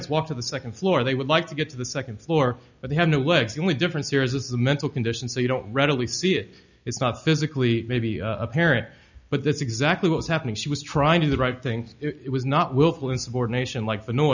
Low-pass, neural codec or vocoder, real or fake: 7.2 kHz; none; real